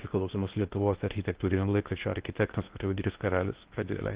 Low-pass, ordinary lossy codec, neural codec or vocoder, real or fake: 3.6 kHz; Opus, 16 kbps; codec, 16 kHz in and 24 kHz out, 0.6 kbps, FocalCodec, streaming, 2048 codes; fake